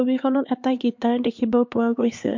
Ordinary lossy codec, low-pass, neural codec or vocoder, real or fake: MP3, 48 kbps; 7.2 kHz; codec, 16 kHz, 2 kbps, X-Codec, HuBERT features, trained on LibriSpeech; fake